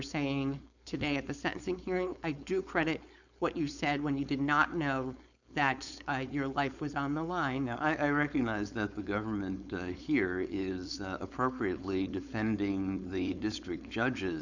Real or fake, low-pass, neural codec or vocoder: fake; 7.2 kHz; codec, 16 kHz, 4.8 kbps, FACodec